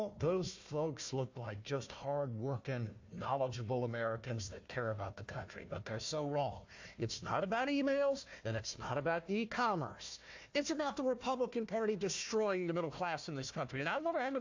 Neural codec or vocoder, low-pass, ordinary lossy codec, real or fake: codec, 16 kHz, 1 kbps, FunCodec, trained on Chinese and English, 50 frames a second; 7.2 kHz; AAC, 48 kbps; fake